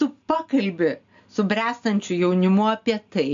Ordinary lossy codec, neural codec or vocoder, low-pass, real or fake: AAC, 64 kbps; none; 7.2 kHz; real